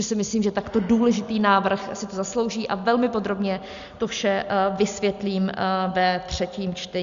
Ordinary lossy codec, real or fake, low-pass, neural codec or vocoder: Opus, 64 kbps; real; 7.2 kHz; none